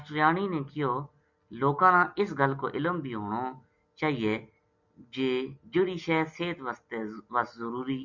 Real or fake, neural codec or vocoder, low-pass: real; none; 7.2 kHz